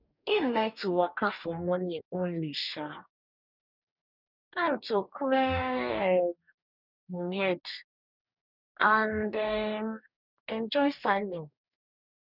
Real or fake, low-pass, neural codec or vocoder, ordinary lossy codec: fake; 5.4 kHz; codec, 44.1 kHz, 2.6 kbps, DAC; none